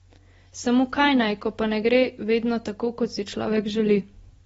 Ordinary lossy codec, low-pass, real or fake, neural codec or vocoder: AAC, 24 kbps; 19.8 kHz; real; none